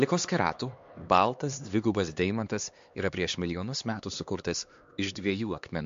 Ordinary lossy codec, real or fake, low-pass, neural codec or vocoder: MP3, 48 kbps; fake; 7.2 kHz; codec, 16 kHz, 2 kbps, X-Codec, HuBERT features, trained on LibriSpeech